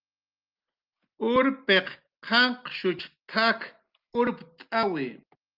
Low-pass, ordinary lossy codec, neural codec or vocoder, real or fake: 5.4 kHz; Opus, 24 kbps; none; real